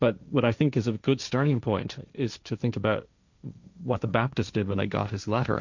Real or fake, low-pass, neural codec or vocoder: fake; 7.2 kHz; codec, 16 kHz, 1.1 kbps, Voila-Tokenizer